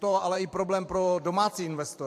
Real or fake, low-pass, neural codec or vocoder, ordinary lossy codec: fake; 14.4 kHz; vocoder, 44.1 kHz, 128 mel bands every 512 samples, BigVGAN v2; AAC, 64 kbps